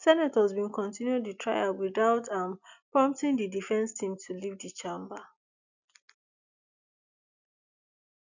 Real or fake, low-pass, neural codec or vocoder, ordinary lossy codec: real; 7.2 kHz; none; none